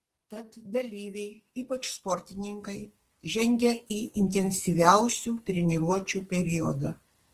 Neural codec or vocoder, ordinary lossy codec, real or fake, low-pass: codec, 44.1 kHz, 2.6 kbps, SNAC; Opus, 24 kbps; fake; 14.4 kHz